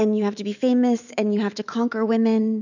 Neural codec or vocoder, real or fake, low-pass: autoencoder, 48 kHz, 128 numbers a frame, DAC-VAE, trained on Japanese speech; fake; 7.2 kHz